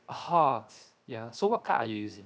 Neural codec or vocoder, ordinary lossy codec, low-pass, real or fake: codec, 16 kHz, 0.7 kbps, FocalCodec; none; none; fake